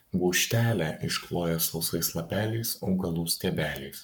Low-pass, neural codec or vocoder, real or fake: 19.8 kHz; codec, 44.1 kHz, 7.8 kbps, Pupu-Codec; fake